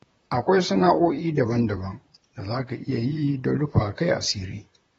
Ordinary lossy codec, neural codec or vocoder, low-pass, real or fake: AAC, 24 kbps; vocoder, 44.1 kHz, 128 mel bands, Pupu-Vocoder; 19.8 kHz; fake